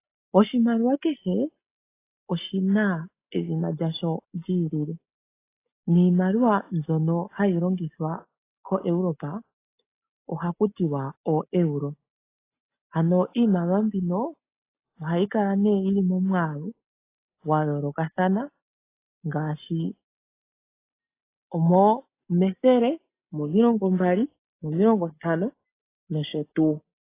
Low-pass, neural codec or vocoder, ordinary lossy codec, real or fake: 3.6 kHz; none; AAC, 24 kbps; real